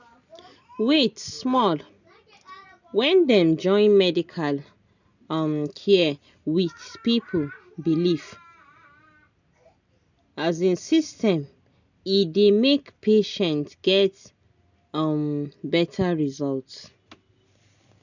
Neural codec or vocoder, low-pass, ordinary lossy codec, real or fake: none; 7.2 kHz; none; real